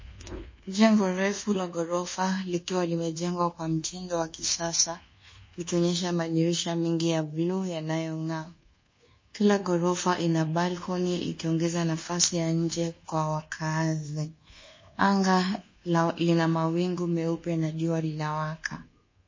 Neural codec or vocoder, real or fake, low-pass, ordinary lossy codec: codec, 24 kHz, 1.2 kbps, DualCodec; fake; 7.2 kHz; MP3, 32 kbps